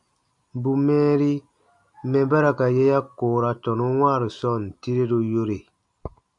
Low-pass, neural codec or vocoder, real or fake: 10.8 kHz; none; real